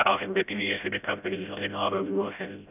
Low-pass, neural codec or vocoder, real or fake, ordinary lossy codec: 3.6 kHz; codec, 16 kHz, 0.5 kbps, FreqCodec, smaller model; fake; none